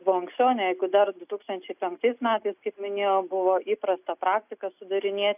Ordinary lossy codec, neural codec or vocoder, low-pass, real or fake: AAC, 32 kbps; none; 3.6 kHz; real